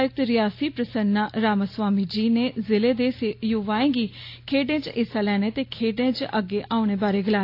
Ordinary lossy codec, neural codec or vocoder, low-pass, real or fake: AAC, 32 kbps; none; 5.4 kHz; real